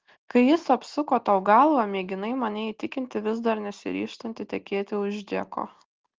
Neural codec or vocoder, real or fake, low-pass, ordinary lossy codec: none; real; 7.2 kHz; Opus, 16 kbps